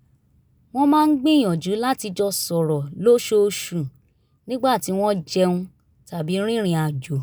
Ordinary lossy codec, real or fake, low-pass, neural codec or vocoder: none; real; none; none